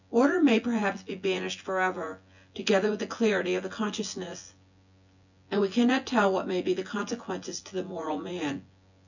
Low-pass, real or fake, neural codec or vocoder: 7.2 kHz; fake; vocoder, 24 kHz, 100 mel bands, Vocos